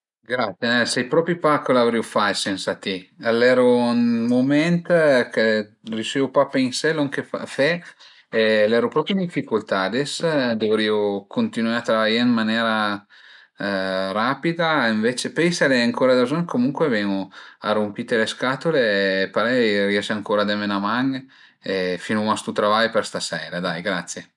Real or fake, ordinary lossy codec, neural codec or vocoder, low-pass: real; none; none; 10.8 kHz